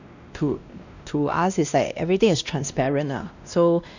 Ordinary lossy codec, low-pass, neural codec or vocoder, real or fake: none; 7.2 kHz; codec, 16 kHz, 1 kbps, X-Codec, WavLM features, trained on Multilingual LibriSpeech; fake